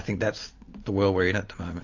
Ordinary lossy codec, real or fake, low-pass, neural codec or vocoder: AAC, 48 kbps; real; 7.2 kHz; none